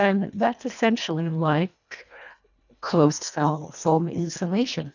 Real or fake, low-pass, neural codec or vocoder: fake; 7.2 kHz; codec, 24 kHz, 1.5 kbps, HILCodec